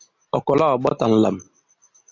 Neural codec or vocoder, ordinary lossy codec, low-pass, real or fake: none; AAC, 48 kbps; 7.2 kHz; real